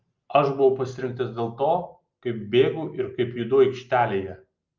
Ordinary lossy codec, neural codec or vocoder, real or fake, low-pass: Opus, 24 kbps; none; real; 7.2 kHz